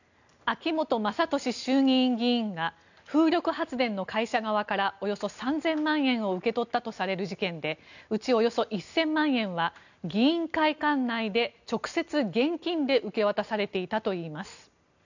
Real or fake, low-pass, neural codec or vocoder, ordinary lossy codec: real; 7.2 kHz; none; none